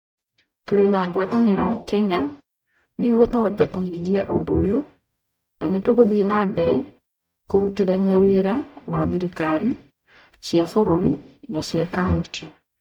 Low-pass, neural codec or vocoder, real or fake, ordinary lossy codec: 19.8 kHz; codec, 44.1 kHz, 0.9 kbps, DAC; fake; none